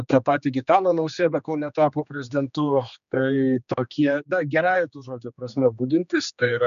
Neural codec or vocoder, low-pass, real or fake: codec, 16 kHz, 2 kbps, X-Codec, HuBERT features, trained on general audio; 7.2 kHz; fake